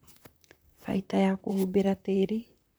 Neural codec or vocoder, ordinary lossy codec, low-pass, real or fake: vocoder, 44.1 kHz, 128 mel bands, Pupu-Vocoder; none; none; fake